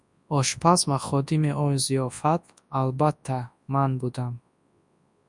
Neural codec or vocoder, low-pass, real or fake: codec, 24 kHz, 0.9 kbps, WavTokenizer, large speech release; 10.8 kHz; fake